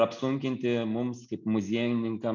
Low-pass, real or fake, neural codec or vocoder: 7.2 kHz; real; none